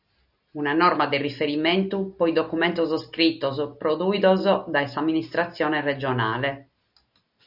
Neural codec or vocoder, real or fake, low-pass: none; real; 5.4 kHz